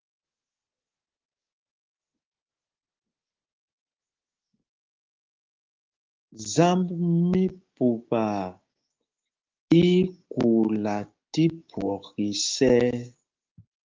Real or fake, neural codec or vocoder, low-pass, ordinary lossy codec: fake; codec, 16 kHz, 6 kbps, DAC; 7.2 kHz; Opus, 32 kbps